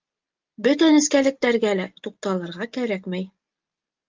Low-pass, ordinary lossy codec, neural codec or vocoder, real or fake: 7.2 kHz; Opus, 32 kbps; none; real